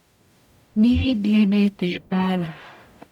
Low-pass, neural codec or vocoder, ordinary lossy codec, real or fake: 19.8 kHz; codec, 44.1 kHz, 0.9 kbps, DAC; none; fake